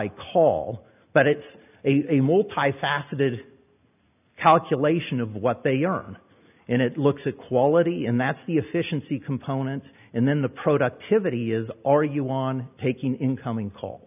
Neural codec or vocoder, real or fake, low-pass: none; real; 3.6 kHz